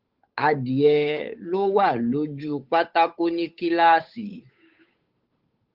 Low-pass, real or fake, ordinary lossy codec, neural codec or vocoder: 5.4 kHz; fake; Opus, 32 kbps; codec, 16 kHz, 8 kbps, FunCodec, trained on Chinese and English, 25 frames a second